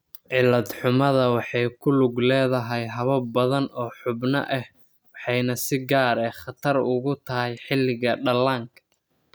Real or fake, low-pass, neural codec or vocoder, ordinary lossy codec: real; none; none; none